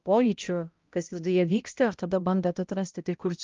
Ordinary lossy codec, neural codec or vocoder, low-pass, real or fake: Opus, 32 kbps; codec, 16 kHz, 0.5 kbps, X-Codec, HuBERT features, trained on balanced general audio; 7.2 kHz; fake